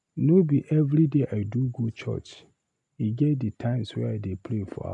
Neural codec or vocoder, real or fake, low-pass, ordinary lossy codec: none; real; 10.8 kHz; none